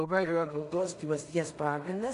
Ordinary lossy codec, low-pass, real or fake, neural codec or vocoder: MP3, 64 kbps; 10.8 kHz; fake; codec, 16 kHz in and 24 kHz out, 0.4 kbps, LongCat-Audio-Codec, two codebook decoder